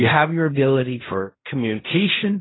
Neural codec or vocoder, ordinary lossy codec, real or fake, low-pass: codec, 16 kHz in and 24 kHz out, 0.4 kbps, LongCat-Audio-Codec, fine tuned four codebook decoder; AAC, 16 kbps; fake; 7.2 kHz